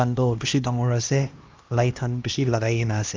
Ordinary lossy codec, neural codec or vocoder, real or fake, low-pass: Opus, 32 kbps; codec, 16 kHz, 1 kbps, X-Codec, HuBERT features, trained on LibriSpeech; fake; 7.2 kHz